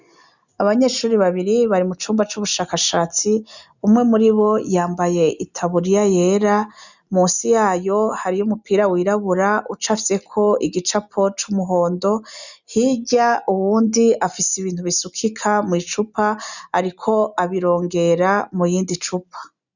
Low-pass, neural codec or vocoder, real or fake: 7.2 kHz; none; real